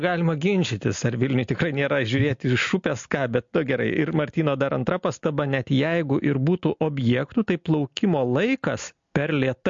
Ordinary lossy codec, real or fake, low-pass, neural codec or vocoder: MP3, 48 kbps; real; 7.2 kHz; none